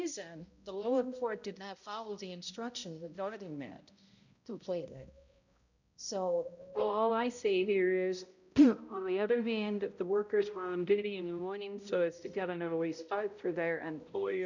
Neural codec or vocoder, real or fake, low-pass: codec, 16 kHz, 0.5 kbps, X-Codec, HuBERT features, trained on balanced general audio; fake; 7.2 kHz